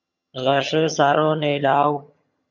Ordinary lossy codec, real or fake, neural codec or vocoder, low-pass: MP3, 48 kbps; fake; vocoder, 22.05 kHz, 80 mel bands, HiFi-GAN; 7.2 kHz